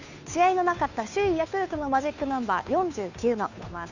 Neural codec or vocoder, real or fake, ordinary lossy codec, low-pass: codec, 16 kHz, 2 kbps, FunCodec, trained on Chinese and English, 25 frames a second; fake; none; 7.2 kHz